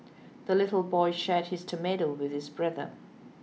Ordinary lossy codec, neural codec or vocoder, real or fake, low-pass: none; none; real; none